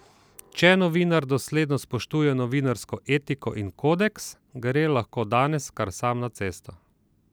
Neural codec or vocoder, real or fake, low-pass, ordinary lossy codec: none; real; none; none